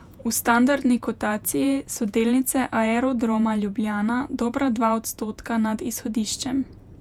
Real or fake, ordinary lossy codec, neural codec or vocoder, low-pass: fake; none; vocoder, 48 kHz, 128 mel bands, Vocos; 19.8 kHz